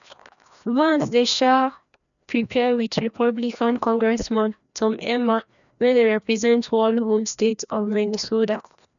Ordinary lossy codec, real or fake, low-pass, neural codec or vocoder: none; fake; 7.2 kHz; codec, 16 kHz, 1 kbps, FreqCodec, larger model